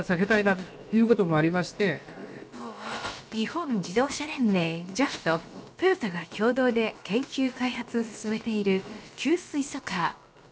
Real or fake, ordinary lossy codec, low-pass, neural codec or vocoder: fake; none; none; codec, 16 kHz, about 1 kbps, DyCAST, with the encoder's durations